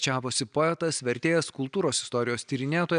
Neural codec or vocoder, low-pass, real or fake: none; 9.9 kHz; real